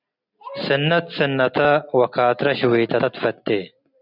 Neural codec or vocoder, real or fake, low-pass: none; real; 5.4 kHz